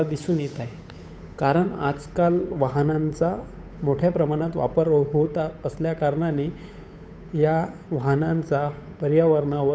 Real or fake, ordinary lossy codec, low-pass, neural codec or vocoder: fake; none; none; codec, 16 kHz, 8 kbps, FunCodec, trained on Chinese and English, 25 frames a second